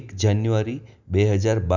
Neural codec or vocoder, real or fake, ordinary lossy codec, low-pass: none; real; none; 7.2 kHz